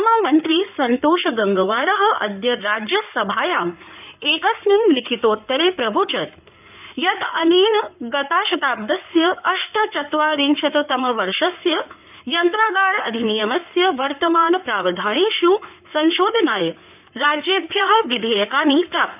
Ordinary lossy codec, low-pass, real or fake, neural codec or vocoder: none; 3.6 kHz; fake; codec, 16 kHz in and 24 kHz out, 2.2 kbps, FireRedTTS-2 codec